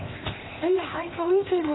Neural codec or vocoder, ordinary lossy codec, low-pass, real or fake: codec, 16 kHz, 0.8 kbps, ZipCodec; AAC, 16 kbps; 7.2 kHz; fake